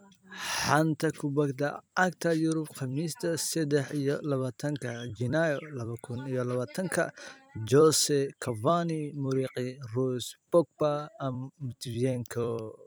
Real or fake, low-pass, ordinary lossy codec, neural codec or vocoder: fake; none; none; vocoder, 44.1 kHz, 128 mel bands every 256 samples, BigVGAN v2